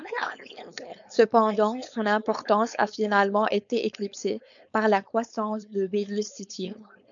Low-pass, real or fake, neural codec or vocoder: 7.2 kHz; fake; codec, 16 kHz, 4.8 kbps, FACodec